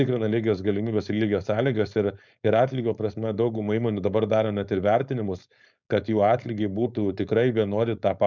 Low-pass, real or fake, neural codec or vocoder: 7.2 kHz; fake; codec, 16 kHz, 4.8 kbps, FACodec